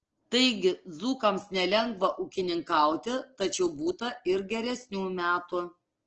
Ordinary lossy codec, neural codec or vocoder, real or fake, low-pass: Opus, 16 kbps; none; real; 7.2 kHz